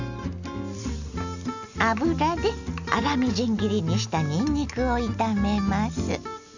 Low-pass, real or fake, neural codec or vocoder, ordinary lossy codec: 7.2 kHz; real; none; none